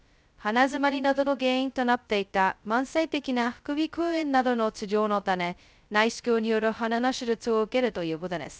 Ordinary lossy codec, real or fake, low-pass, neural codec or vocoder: none; fake; none; codec, 16 kHz, 0.2 kbps, FocalCodec